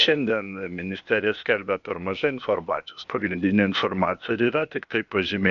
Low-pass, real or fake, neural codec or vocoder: 7.2 kHz; fake; codec, 16 kHz, 0.8 kbps, ZipCodec